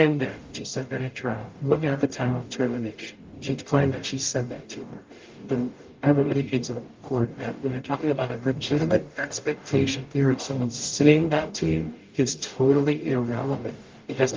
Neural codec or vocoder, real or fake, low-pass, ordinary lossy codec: codec, 44.1 kHz, 0.9 kbps, DAC; fake; 7.2 kHz; Opus, 32 kbps